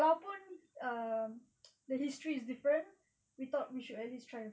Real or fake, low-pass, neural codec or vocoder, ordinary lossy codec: real; none; none; none